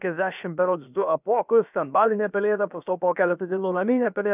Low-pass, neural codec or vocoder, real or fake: 3.6 kHz; codec, 16 kHz, about 1 kbps, DyCAST, with the encoder's durations; fake